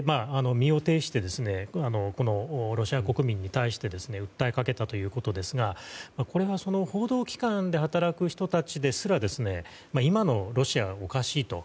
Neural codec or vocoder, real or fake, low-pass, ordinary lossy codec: none; real; none; none